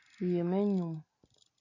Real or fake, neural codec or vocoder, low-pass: real; none; 7.2 kHz